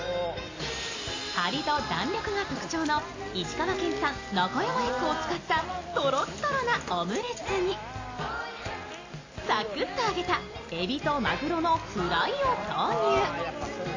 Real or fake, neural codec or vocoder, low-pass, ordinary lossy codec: real; none; 7.2 kHz; AAC, 32 kbps